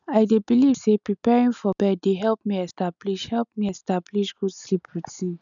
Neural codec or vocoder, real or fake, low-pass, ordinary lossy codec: none; real; 7.2 kHz; none